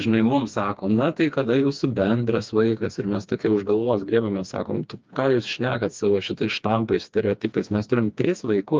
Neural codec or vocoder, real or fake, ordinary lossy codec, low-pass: codec, 16 kHz, 2 kbps, FreqCodec, smaller model; fake; Opus, 24 kbps; 7.2 kHz